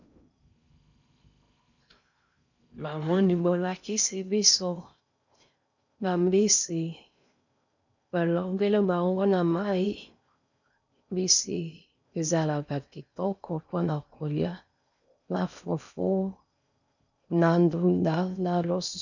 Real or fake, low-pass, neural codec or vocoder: fake; 7.2 kHz; codec, 16 kHz in and 24 kHz out, 0.6 kbps, FocalCodec, streaming, 4096 codes